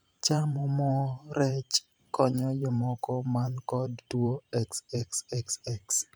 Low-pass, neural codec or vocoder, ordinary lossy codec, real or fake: none; vocoder, 44.1 kHz, 128 mel bands every 512 samples, BigVGAN v2; none; fake